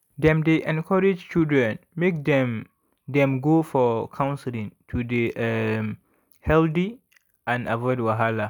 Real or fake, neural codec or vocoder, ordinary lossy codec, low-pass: real; none; none; 19.8 kHz